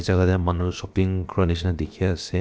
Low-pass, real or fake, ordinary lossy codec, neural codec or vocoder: none; fake; none; codec, 16 kHz, about 1 kbps, DyCAST, with the encoder's durations